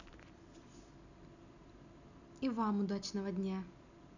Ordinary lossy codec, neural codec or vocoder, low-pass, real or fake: none; none; 7.2 kHz; real